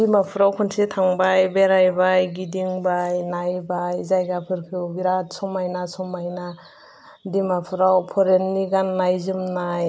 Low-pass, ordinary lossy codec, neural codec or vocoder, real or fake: none; none; none; real